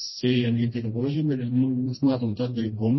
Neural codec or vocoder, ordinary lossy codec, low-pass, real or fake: codec, 16 kHz, 1 kbps, FreqCodec, smaller model; MP3, 24 kbps; 7.2 kHz; fake